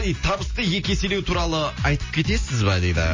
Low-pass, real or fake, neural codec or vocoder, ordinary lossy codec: 7.2 kHz; real; none; MP3, 32 kbps